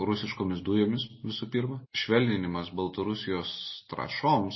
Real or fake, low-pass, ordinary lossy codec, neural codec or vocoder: real; 7.2 kHz; MP3, 24 kbps; none